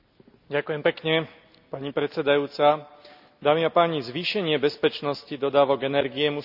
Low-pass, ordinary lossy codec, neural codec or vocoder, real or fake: 5.4 kHz; none; none; real